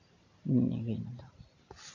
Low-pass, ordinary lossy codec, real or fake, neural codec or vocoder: 7.2 kHz; AAC, 48 kbps; real; none